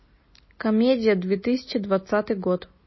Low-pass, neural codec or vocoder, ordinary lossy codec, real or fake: 7.2 kHz; none; MP3, 24 kbps; real